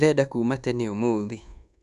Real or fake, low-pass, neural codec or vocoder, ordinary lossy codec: fake; 10.8 kHz; codec, 24 kHz, 1.2 kbps, DualCodec; none